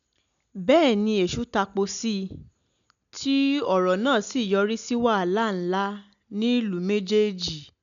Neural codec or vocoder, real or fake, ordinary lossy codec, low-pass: none; real; none; 7.2 kHz